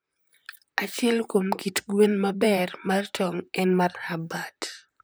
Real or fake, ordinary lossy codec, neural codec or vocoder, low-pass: fake; none; vocoder, 44.1 kHz, 128 mel bands, Pupu-Vocoder; none